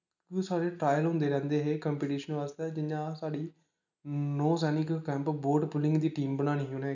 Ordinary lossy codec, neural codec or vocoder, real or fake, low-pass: none; none; real; 7.2 kHz